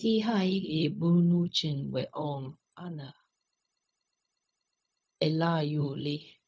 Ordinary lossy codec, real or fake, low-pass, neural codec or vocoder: none; fake; none; codec, 16 kHz, 0.4 kbps, LongCat-Audio-Codec